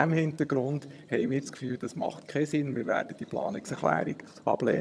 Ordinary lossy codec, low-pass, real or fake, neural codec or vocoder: none; none; fake; vocoder, 22.05 kHz, 80 mel bands, HiFi-GAN